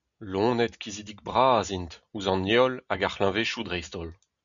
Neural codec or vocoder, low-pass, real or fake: none; 7.2 kHz; real